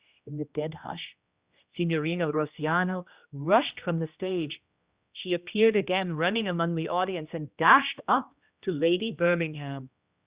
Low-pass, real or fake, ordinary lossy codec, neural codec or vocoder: 3.6 kHz; fake; Opus, 64 kbps; codec, 16 kHz, 1 kbps, X-Codec, HuBERT features, trained on general audio